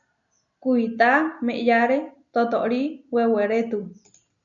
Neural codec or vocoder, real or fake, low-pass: none; real; 7.2 kHz